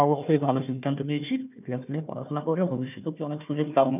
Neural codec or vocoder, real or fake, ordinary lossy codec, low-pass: codec, 16 kHz, 1 kbps, FunCodec, trained on Chinese and English, 50 frames a second; fake; none; 3.6 kHz